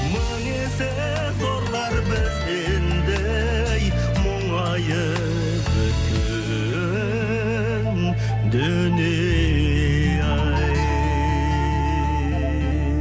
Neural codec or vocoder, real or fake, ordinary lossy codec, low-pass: none; real; none; none